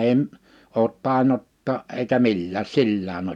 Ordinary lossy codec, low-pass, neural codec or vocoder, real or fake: none; 19.8 kHz; none; real